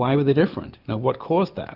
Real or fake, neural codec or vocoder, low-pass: real; none; 5.4 kHz